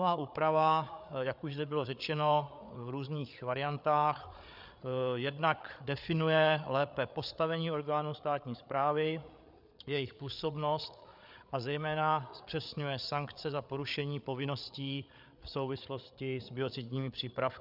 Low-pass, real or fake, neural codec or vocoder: 5.4 kHz; fake; codec, 16 kHz, 8 kbps, FreqCodec, larger model